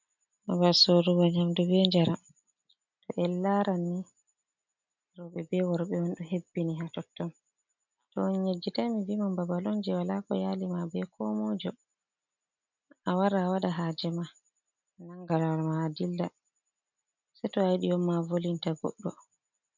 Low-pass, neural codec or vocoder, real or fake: 7.2 kHz; none; real